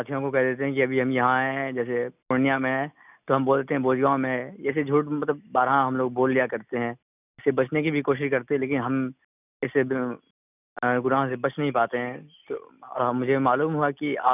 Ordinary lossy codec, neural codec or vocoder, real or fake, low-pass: none; none; real; 3.6 kHz